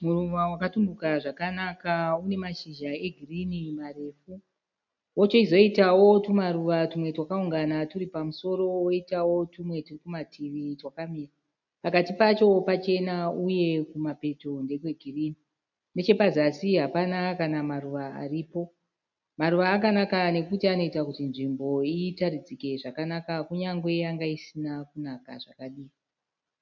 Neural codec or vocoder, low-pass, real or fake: none; 7.2 kHz; real